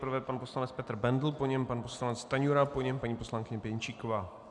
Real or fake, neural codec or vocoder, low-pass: real; none; 10.8 kHz